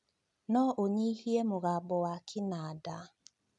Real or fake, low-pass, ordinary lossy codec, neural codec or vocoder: real; 10.8 kHz; none; none